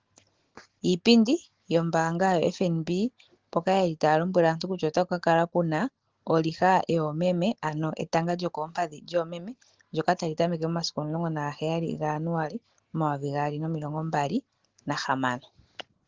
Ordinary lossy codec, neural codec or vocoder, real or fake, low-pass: Opus, 16 kbps; none; real; 7.2 kHz